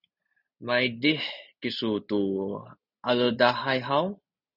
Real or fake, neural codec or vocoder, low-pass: real; none; 5.4 kHz